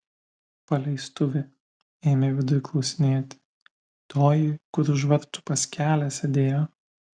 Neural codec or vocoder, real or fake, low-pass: none; real; 9.9 kHz